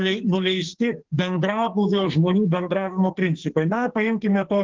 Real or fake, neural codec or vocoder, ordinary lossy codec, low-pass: fake; codec, 44.1 kHz, 2.6 kbps, SNAC; Opus, 16 kbps; 7.2 kHz